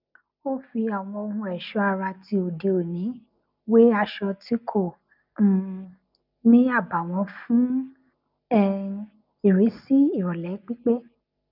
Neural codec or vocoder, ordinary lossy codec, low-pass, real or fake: none; none; 5.4 kHz; real